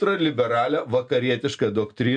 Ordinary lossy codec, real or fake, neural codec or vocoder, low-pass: MP3, 64 kbps; real; none; 9.9 kHz